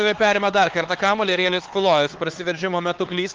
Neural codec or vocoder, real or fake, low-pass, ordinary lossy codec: codec, 16 kHz, 4 kbps, X-Codec, HuBERT features, trained on LibriSpeech; fake; 7.2 kHz; Opus, 16 kbps